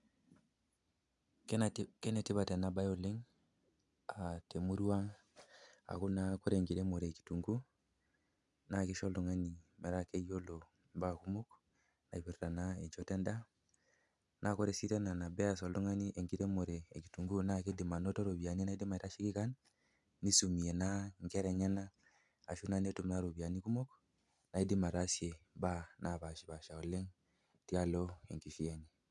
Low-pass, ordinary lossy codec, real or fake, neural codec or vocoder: 10.8 kHz; none; real; none